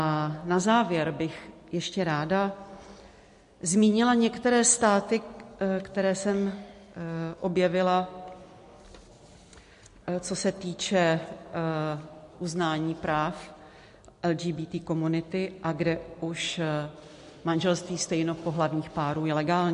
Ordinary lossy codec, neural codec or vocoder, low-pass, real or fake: MP3, 48 kbps; none; 10.8 kHz; real